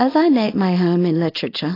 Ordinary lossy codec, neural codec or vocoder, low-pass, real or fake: AAC, 24 kbps; codec, 24 kHz, 0.9 kbps, WavTokenizer, small release; 5.4 kHz; fake